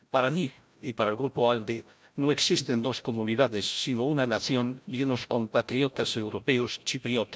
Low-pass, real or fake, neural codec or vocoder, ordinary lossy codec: none; fake; codec, 16 kHz, 0.5 kbps, FreqCodec, larger model; none